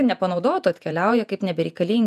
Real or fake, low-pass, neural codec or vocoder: fake; 14.4 kHz; vocoder, 48 kHz, 128 mel bands, Vocos